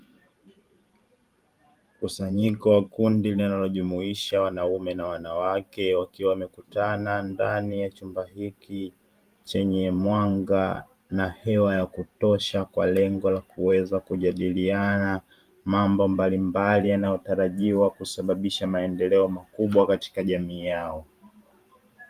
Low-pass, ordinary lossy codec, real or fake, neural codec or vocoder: 14.4 kHz; Opus, 32 kbps; fake; vocoder, 44.1 kHz, 128 mel bands every 512 samples, BigVGAN v2